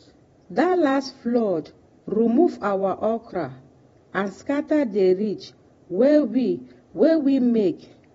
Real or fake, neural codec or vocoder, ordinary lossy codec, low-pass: fake; vocoder, 48 kHz, 128 mel bands, Vocos; AAC, 24 kbps; 19.8 kHz